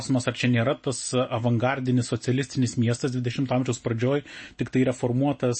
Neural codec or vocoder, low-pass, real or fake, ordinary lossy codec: none; 9.9 kHz; real; MP3, 32 kbps